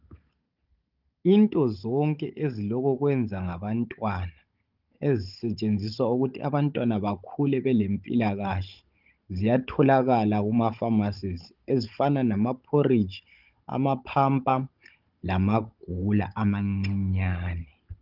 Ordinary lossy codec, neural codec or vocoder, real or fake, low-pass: Opus, 32 kbps; codec, 16 kHz, 16 kbps, FunCodec, trained on Chinese and English, 50 frames a second; fake; 5.4 kHz